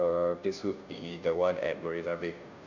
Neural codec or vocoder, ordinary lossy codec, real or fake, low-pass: codec, 16 kHz, 0.5 kbps, FunCodec, trained on Chinese and English, 25 frames a second; none; fake; 7.2 kHz